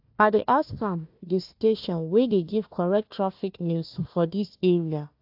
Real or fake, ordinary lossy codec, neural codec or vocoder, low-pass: fake; AAC, 48 kbps; codec, 16 kHz, 1 kbps, FunCodec, trained on Chinese and English, 50 frames a second; 5.4 kHz